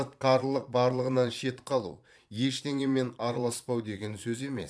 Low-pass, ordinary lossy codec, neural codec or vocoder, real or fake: none; none; vocoder, 22.05 kHz, 80 mel bands, Vocos; fake